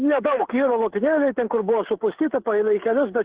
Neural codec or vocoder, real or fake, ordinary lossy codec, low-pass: codec, 16 kHz, 8 kbps, FreqCodec, smaller model; fake; Opus, 16 kbps; 3.6 kHz